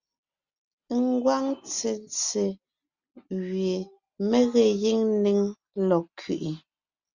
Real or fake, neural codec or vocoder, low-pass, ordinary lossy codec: real; none; 7.2 kHz; Opus, 64 kbps